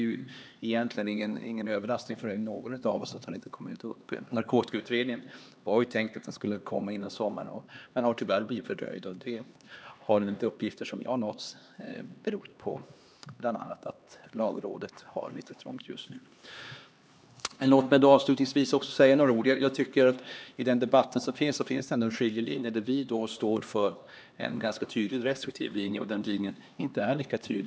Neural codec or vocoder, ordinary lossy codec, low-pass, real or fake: codec, 16 kHz, 2 kbps, X-Codec, HuBERT features, trained on LibriSpeech; none; none; fake